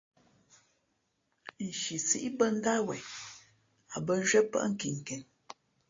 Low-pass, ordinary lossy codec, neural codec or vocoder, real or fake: 7.2 kHz; AAC, 32 kbps; none; real